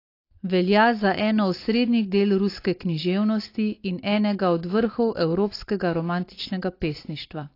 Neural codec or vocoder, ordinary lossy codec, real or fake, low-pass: none; AAC, 32 kbps; real; 5.4 kHz